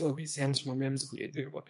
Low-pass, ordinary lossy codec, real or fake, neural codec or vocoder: 10.8 kHz; MP3, 64 kbps; fake; codec, 24 kHz, 0.9 kbps, WavTokenizer, small release